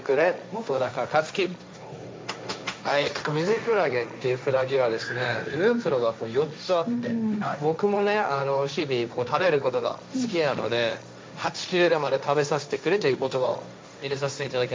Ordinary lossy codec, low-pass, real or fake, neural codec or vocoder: none; none; fake; codec, 16 kHz, 1.1 kbps, Voila-Tokenizer